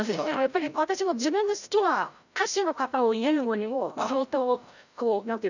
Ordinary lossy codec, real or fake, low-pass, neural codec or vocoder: none; fake; 7.2 kHz; codec, 16 kHz, 0.5 kbps, FreqCodec, larger model